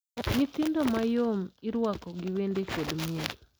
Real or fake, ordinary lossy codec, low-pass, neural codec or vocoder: real; none; none; none